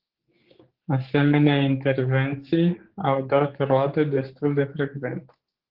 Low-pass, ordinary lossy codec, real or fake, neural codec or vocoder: 5.4 kHz; Opus, 16 kbps; fake; codec, 16 kHz, 4 kbps, X-Codec, HuBERT features, trained on general audio